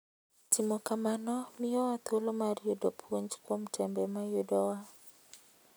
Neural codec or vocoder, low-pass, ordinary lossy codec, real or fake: none; none; none; real